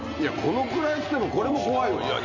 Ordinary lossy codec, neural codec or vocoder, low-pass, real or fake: none; none; 7.2 kHz; real